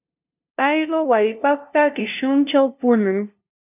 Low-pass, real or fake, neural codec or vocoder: 3.6 kHz; fake; codec, 16 kHz, 0.5 kbps, FunCodec, trained on LibriTTS, 25 frames a second